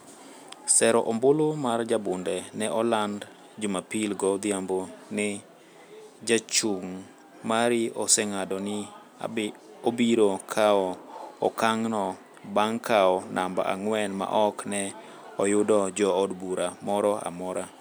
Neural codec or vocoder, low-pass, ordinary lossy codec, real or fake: none; none; none; real